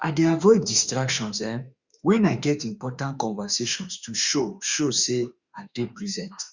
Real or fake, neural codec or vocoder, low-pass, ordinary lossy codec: fake; autoencoder, 48 kHz, 32 numbers a frame, DAC-VAE, trained on Japanese speech; 7.2 kHz; Opus, 64 kbps